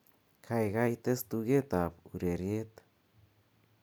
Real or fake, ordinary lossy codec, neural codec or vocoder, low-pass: real; none; none; none